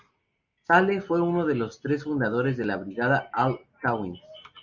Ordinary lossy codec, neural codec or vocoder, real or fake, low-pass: Opus, 64 kbps; none; real; 7.2 kHz